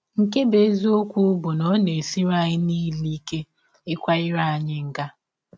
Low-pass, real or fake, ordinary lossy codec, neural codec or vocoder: none; real; none; none